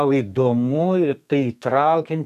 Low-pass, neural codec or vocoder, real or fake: 14.4 kHz; codec, 32 kHz, 1.9 kbps, SNAC; fake